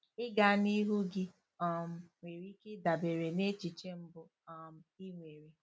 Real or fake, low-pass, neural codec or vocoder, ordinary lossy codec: real; none; none; none